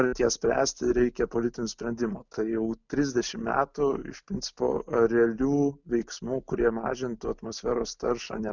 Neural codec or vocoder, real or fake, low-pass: none; real; 7.2 kHz